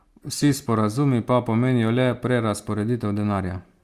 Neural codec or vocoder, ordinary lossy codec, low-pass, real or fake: none; Opus, 32 kbps; 14.4 kHz; real